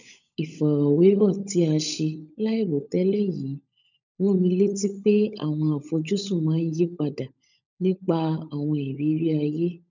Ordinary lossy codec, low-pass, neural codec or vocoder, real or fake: none; 7.2 kHz; codec, 16 kHz, 16 kbps, FunCodec, trained on LibriTTS, 50 frames a second; fake